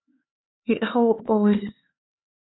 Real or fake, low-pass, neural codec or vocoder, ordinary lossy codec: fake; 7.2 kHz; codec, 16 kHz, 4 kbps, X-Codec, HuBERT features, trained on LibriSpeech; AAC, 16 kbps